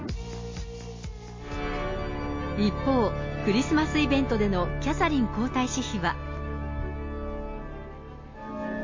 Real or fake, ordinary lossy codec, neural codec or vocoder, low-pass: real; MP3, 32 kbps; none; 7.2 kHz